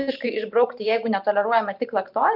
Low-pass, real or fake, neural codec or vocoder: 5.4 kHz; fake; vocoder, 44.1 kHz, 128 mel bands every 512 samples, BigVGAN v2